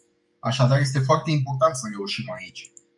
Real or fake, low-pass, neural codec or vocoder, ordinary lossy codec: fake; 10.8 kHz; codec, 44.1 kHz, 7.8 kbps, DAC; MP3, 96 kbps